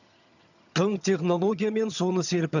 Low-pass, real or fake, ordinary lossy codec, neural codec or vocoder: 7.2 kHz; fake; none; vocoder, 22.05 kHz, 80 mel bands, HiFi-GAN